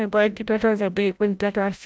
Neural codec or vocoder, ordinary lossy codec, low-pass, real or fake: codec, 16 kHz, 0.5 kbps, FreqCodec, larger model; none; none; fake